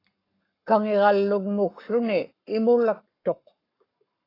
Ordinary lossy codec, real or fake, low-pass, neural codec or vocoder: AAC, 24 kbps; fake; 5.4 kHz; codec, 44.1 kHz, 7.8 kbps, Pupu-Codec